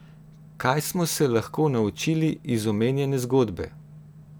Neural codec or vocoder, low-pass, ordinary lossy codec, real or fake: none; none; none; real